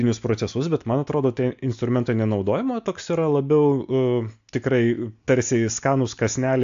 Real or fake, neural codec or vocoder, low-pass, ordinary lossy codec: real; none; 7.2 kHz; AAC, 64 kbps